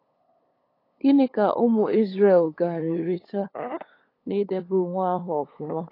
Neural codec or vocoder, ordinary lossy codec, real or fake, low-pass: codec, 16 kHz, 8 kbps, FunCodec, trained on LibriTTS, 25 frames a second; AAC, 24 kbps; fake; 5.4 kHz